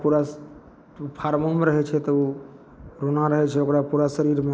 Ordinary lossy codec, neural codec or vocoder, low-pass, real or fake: none; none; none; real